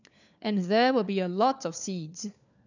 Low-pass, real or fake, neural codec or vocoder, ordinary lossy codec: 7.2 kHz; fake; codec, 16 kHz, 4 kbps, FunCodec, trained on LibriTTS, 50 frames a second; none